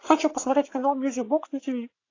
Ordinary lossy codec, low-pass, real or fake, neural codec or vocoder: AAC, 32 kbps; 7.2 kHz; fake; vocoder, 44.1 kHz, 80 mel bands, Vocos